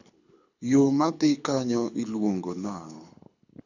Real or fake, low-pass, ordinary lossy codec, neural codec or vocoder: fake; 7.2 kHz; none; codec, 24 kHz, 6 kbps, HILCodec